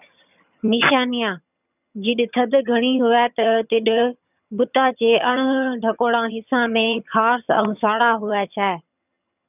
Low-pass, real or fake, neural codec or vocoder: 3.6 kHz; fake; vocoder, 22.05 kHz, 80 mel bands, HiFi-GAN